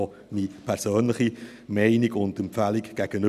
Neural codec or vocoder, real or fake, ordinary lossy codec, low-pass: none; real; none; 14.4 kHz